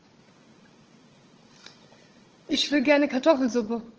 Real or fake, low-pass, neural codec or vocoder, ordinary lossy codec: fake; 7.2 kHz; codec, 16 kHz, 16 kbps, FunCodec, trained on Chinese and English, 50 frames a second; Opus, 16 kbps